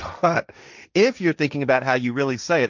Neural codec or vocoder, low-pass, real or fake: codec, 16 kHz, 1.1 kbps, Voila-Tokenizer; 7.2 kHz; fake